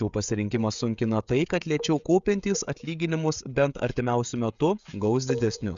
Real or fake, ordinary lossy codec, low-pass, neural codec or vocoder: fake; Opus, 64 kbps; 7.2 kHz; codec, 16 kHz, 16 kbps, FreqCodec, smaller model